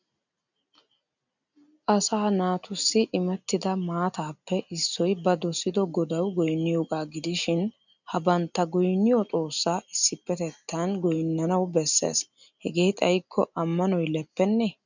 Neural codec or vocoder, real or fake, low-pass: none; real; 7.2 kHz